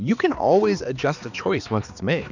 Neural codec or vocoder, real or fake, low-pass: codec, 16 kHz, 2 kbps, X-Codec, HuBERT features, trained on balanced general audio; fake; 7.2 kHz